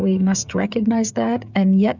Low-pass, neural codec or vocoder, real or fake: 7.2 kHz; codec, 16 kHz, 16 kbps, FreqCodec, smaller model; fake